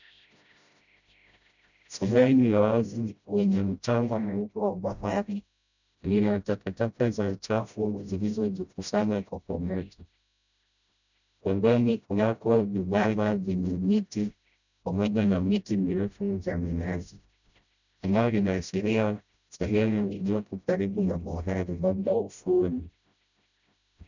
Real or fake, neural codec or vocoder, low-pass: fake; codec, 16 kHz, 0.5 kbps, FreqCodec, smaller model; 7.2 kHz